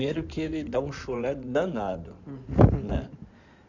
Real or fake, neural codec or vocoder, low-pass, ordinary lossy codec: fake; codec, 16 kHz in and 24 kHz out, 2.2 kbps, FireRedTTS-2 codec; 7.2 kHz; none